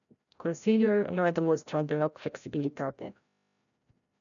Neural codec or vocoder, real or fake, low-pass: codec, 16 kHz, 0.5 kbps, FreqCodec, larger model; fake; 7.2 kHz